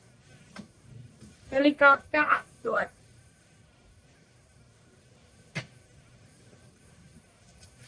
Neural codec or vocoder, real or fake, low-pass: codec, 44.1 kHz, 1.7 kbps, Pupu-Codec; fake; 9.9 kHz